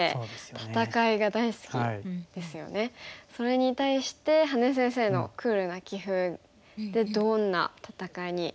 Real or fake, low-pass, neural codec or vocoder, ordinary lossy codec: real; none; none; none